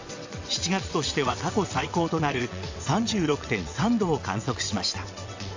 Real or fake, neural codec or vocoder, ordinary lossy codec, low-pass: fake; vocoder, 22.05 kHz, 80 mel bands, WaveNeXt; AAC, 48 kbps; 7.2 kHz